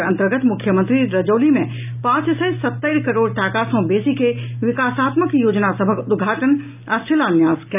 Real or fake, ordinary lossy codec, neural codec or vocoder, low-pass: real; none; none; 3.6 kHz